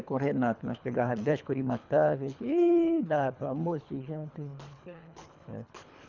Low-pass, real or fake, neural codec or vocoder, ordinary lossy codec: 7.2 kHz; fake; codec, 24 kHz, 3 kbps, HILCodec; none